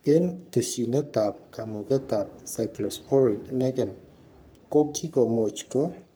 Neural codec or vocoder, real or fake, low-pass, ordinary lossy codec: codec, 44.1 kHz, 3.4 kbps, Pupu-Codec; fake; none; none